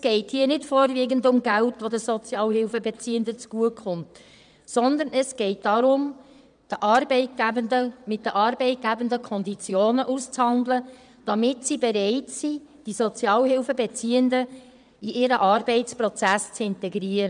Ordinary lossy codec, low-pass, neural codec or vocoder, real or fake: none; 9.9 kHz; vocoder, 22.05 kHz, 80 mel bands, Vocos; fake